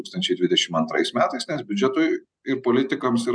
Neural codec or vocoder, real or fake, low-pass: none; real; 9.9 kHz